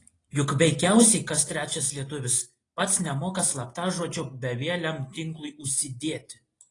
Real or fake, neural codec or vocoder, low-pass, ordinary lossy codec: real; none; 10.8 kHz; AAC, 32 kbps